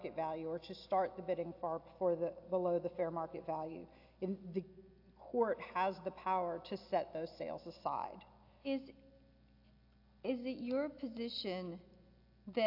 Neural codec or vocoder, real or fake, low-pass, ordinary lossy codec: none; real; 5.4 kHz; AAC, 48 kbps